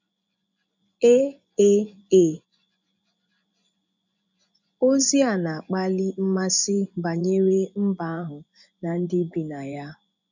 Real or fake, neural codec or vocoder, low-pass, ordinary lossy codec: fake; vocoder, 24 kHz, 100 mel bands, Vocos; 7.2 kHz; none